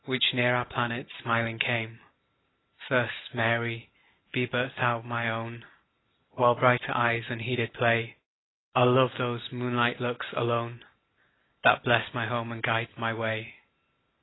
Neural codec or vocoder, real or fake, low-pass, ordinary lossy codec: none; real; 7.2 kHz; AAC, 16 kbps